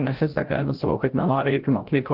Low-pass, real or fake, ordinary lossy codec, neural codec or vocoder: 5.4 kHz; fake; Opus, 16 kbps; codec, 16 kHz, 0.5 kbps, FreqCodec, larger model